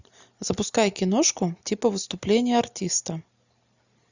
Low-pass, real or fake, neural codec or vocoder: 7.2 kHz; real; none